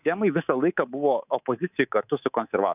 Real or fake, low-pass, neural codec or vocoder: fake; 3.6 kHz; codec, 24 kHz, 3.1 kbps, DualCodec